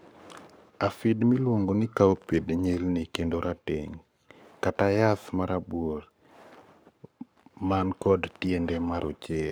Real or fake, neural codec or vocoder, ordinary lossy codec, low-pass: fake; codec, 44.1 kHz, 7.8 kbps, Pupu-Codec; none; none